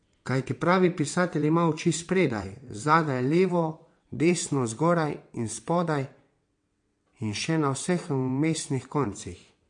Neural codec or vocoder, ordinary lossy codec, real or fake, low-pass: vocoder, 22.05 kHz, 80 mel bands, WaveNeXt; MP3, 48 kbps; fake; 9.9 kHz